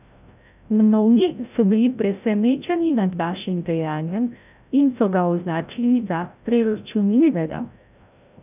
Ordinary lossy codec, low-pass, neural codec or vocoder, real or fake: none; 3.6 kHz; codec, 16 kHz, 0.5 kbps, FreqCodec, larger model; fake